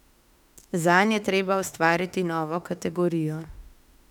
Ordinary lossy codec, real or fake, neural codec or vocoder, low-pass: none; fake; autoencoder, 48 kHz, 32 numbers a frame, DAC-VAE, trained on Japanese speech; 19.8 kHz